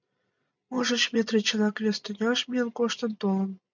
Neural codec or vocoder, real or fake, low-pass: none; real; 7.2 kHz